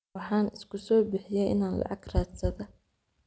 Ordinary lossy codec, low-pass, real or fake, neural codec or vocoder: none; none; real; none